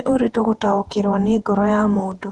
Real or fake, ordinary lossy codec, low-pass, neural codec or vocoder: fake; Opus, 24 kbps; 10.8 kHz; vocoder, 48 kHz, 128 mel bands, Vocos